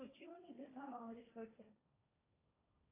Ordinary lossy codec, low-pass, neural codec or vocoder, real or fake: AAC, 16 kbps; 3.6 kHz; codec, 16 kHz, 1.1 kbps, Voila-Tokenizer; fake